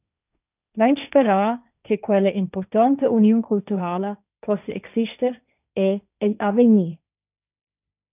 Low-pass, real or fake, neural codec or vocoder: 3.6 kHz; fake; codec, 16 kHz, 1.1 kbps, Voila-Tokenizer